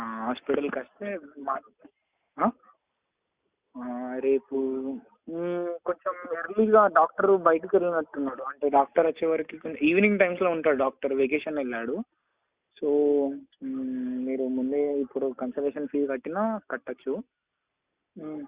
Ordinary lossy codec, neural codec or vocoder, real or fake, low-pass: Opus, 64 kbps; none; real; 3.6 kHz